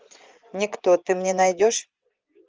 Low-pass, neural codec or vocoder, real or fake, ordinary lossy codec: 7.2 kHz; vocoder, 24 kHz, 100 mel bands, Vocos; fake; Opus, 24 kbps